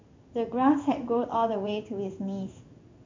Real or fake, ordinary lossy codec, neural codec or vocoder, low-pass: fake; MP3, 64 kbps; codec, 16 kHz in and 24 kHz out, 1 kbps, XY-Tokenizer; 7.2 kHz